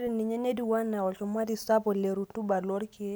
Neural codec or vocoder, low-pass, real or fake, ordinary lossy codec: none; none; real; none